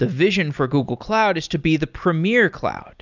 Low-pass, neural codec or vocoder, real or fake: 7.2 kHz; none; real